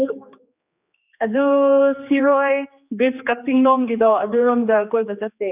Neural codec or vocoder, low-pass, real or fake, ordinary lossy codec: codec, 16 kHz, 2 kbps, X-Codec, HuBERT features, trained on general audio; 3.6 kHz; fake; none